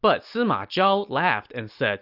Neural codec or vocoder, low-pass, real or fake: none; 5.4 kHz; real